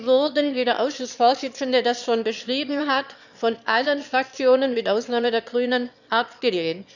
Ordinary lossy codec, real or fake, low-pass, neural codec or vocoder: none; fake; 7.2 kHz; autoencoder, 22.05 kHz, a latent of 192 numbers a frame, VITS, trained on one speaker